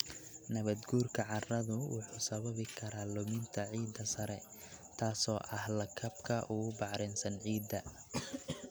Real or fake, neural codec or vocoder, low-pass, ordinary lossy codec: real; none; none; none